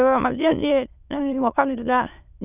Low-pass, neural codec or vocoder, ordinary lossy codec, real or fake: 3.6 kHz; autoencoder, 22.05 kHz, a latent of 192 numbers a frame, VITS, trained on many speakers; none; fake